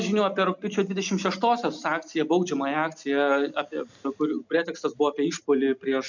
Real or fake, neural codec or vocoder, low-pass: real; none; 7.2 kHz